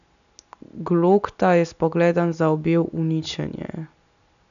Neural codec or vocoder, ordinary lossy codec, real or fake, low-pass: none; none; real; 7.2 kHz